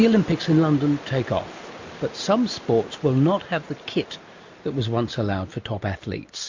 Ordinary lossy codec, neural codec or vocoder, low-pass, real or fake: MP3, 48 kbps; none; 7.2 kHz; real